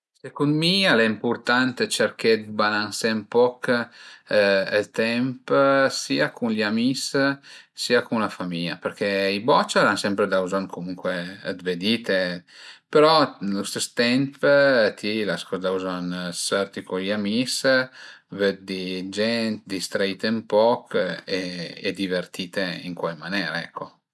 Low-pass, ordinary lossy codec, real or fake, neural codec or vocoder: none; none; real; none